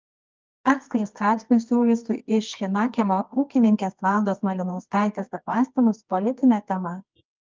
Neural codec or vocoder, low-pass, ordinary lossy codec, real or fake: codec, 24 kHz, 0.9 kbps, WavTokenizer, medium music audio release; 7.2 kHz; Opus, 24 kbps; fake